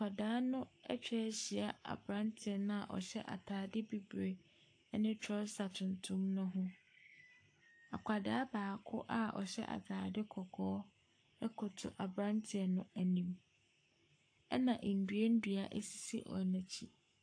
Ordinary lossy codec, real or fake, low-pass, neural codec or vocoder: AAC, 48 kbps; fake; 9.9 kHz; codec, 44.1 kHz, 7.8 kbps, Pupu-Codec